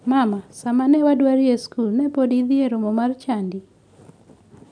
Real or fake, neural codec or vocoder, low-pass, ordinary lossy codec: real; none; 9.9 kHz; none